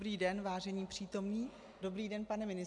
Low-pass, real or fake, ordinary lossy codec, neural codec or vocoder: 10.8 kHz; real; AAC, 64 kbps; none